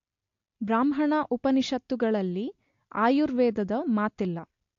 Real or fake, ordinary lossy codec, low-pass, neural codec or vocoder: real; AAC, 48 kbps; 7.2 kHz; none